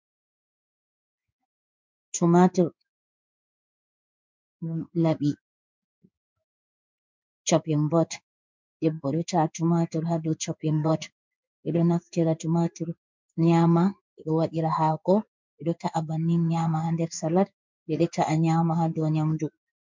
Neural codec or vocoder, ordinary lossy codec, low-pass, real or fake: codec, 16 kHz in and 24 kHz out, 1 kbps, XY-Tokenizer; MP3, 64 kbps; 7.2 kHz; fake